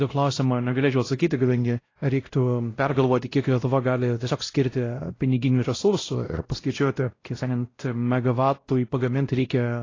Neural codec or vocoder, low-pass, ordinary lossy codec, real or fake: codec, 16 kHz, 0.5 kbps, X-Codec, WavLM features, trained on Multilingual LibriSpeech; 7.2 kHz; AAC, 32 kbps; fake